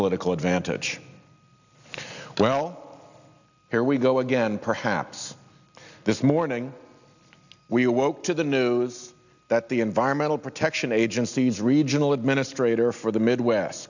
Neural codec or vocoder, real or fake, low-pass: none; real; 7.2 kHz